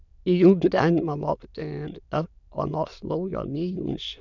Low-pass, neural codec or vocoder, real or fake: 7.2 kHz; autoencoder, 22.05 kHz, a latent of 192 numbers a frame, VITS, trained on many speakers; fake